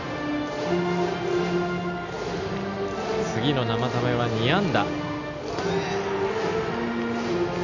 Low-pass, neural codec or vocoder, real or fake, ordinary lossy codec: 7.2 kHz; none; real; none